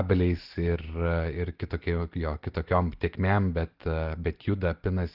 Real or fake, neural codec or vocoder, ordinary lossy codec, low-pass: real; none; Opus, 16 kbps; 5.4 kHz